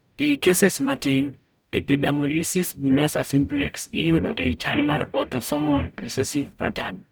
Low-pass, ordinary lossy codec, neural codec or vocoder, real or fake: none; none; codec, 44.1 kHz, 0.9 kbps, DAC; fake